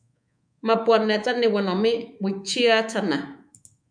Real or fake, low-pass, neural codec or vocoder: fake; 9.9 kHz; codec, 24 kHz, 3.1 kbps, DualCodec